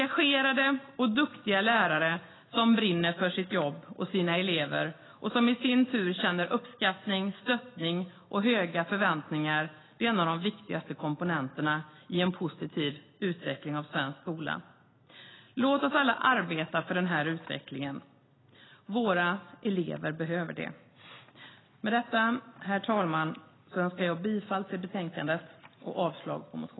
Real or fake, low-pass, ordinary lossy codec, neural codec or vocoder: real; 7.2 kHz; AAC, 16 kbps; none